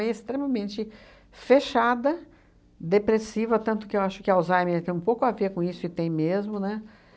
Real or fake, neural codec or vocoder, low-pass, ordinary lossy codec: real; none; none; none